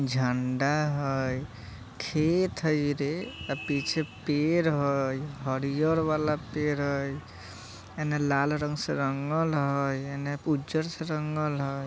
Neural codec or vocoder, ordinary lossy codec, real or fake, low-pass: none; none; real; none